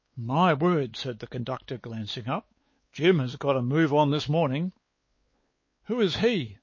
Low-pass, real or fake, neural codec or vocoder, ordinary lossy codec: 7.2 kHz; fake; codec, 16 kHz, 4 kbps, X-Codec, WavLM features, trained on Multilingual LibriSpeech; MP3, 32 kbps